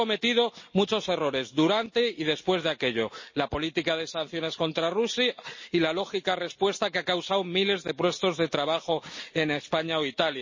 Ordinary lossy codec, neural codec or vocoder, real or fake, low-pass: MP3, 32 kbps; none; real; 7.2 kHz